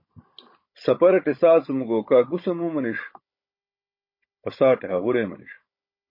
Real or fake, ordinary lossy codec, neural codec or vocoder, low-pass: fake; MP3, 24 kbps; codec, 16 kHz, 16 kbps, FunCodec, trained on Chinese and English, 50 frames a second; 5.4 kHz